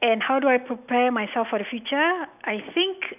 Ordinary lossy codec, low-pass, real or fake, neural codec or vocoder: none; 3.6 kHz; real; none